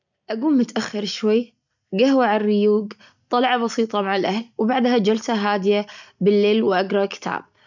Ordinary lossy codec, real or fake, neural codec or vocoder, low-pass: none; real; none; 7.2 kHz